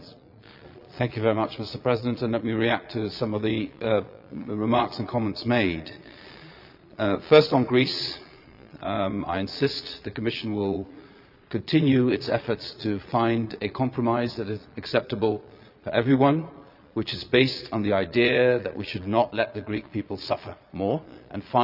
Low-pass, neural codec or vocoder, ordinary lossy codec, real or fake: 5.4 kHz; vocoder, 22.05 kHz, 80 mel bands, Vocos; none; fake